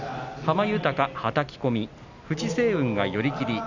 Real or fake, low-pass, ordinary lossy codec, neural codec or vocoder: real; 7.2 kHz; none; none